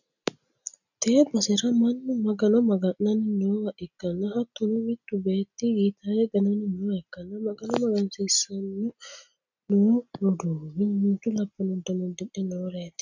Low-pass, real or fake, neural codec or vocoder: 7.2 kHz; real; none